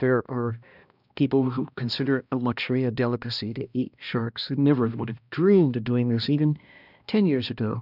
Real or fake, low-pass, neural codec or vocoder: fake; 5.4 kHz; codec, 16 kHz, 1 kbps, X-Codec, HuBERT features, trained on balanced general audio